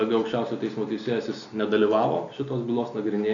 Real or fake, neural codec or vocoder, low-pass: real; none; 7.2 kHz